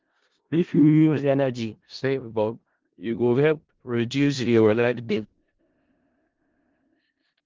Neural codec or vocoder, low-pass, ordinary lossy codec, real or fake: codec, 16 kHz in and 24 kHz out, 0.4 kbps, LongCat-Audio-Codec, four codebook decoder; 7.2 kHz; Opus, 16 kbps; fake